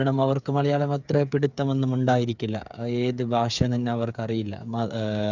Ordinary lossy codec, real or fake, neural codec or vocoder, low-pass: none; fake; codec, 16 kHz, 8 kbps, FreqCodec, smaller model; 7.2 kHz